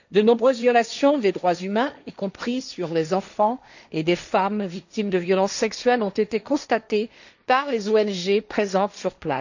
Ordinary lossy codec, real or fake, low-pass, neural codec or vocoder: none; fake; 7.2 kHz; codec, 16 kHz, 1.1 kbps, Voila-Tokenizer